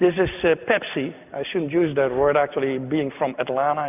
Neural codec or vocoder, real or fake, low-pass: none; real; 3.6 kHz